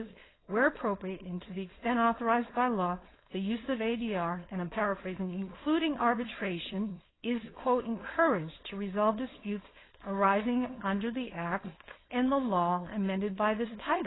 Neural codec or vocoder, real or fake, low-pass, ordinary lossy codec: codec, 16 kHz, 4.8 kbps, FACodec; fake; 7.2 kHz; AAC, 16 kbps